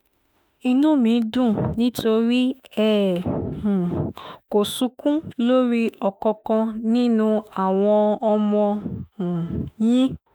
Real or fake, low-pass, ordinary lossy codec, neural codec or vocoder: fake; none; none; autoencoder, 48 kHz, 32 numbers a frame, DAC-VAE, trained on Japanese speech